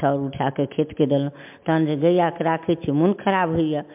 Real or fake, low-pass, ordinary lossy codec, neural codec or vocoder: real; 3.6 kHz; MP3, 32 kbps; none